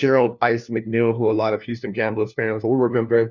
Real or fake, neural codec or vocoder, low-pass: fake; codec, 16 kHz, 1 kbps, FunCodec, trained on LibriTTS, 50 frames a second; 7.2 kHz